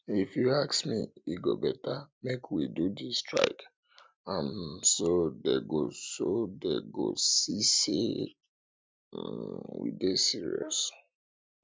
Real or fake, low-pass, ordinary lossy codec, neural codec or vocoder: real; none; none; none